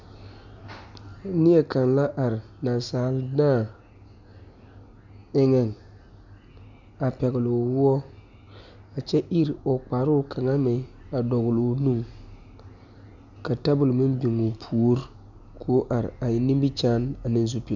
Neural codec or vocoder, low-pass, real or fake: none; 7.2 kHz; real